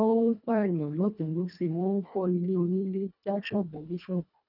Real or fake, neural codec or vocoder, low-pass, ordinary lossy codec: fake; codec, 24 kHz, 1.5 kbps, HILCodec; 5.4 kHz; none